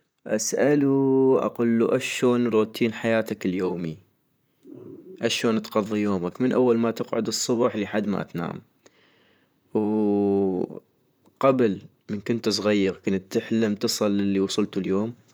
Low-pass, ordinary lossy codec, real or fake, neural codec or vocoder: none; none; fake; vocoder, 44.1 kHz, 128 mel bands, Pupu-Vocoder